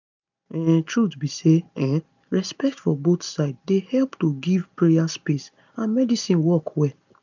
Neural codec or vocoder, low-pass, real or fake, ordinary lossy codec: none; 7.2 kHz; real; none